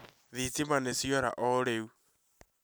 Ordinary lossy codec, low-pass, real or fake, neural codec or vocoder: none; none; real; none